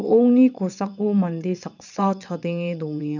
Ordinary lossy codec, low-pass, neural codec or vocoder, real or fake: none; 7.2 kHz; vocoder, 22.05 kHz, 80 mel bands, WaveNeXt; fake